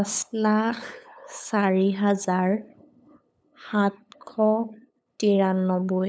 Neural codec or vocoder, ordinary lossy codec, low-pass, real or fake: codec, 16 kHz, 8 kbps, FunCodec, trained on LibriTTS, 25 frames a second; none; none; fake